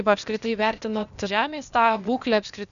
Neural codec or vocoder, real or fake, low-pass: codec, 16 kHz, 0.8 kbps, ZipCodec; fake; 7.2 kHz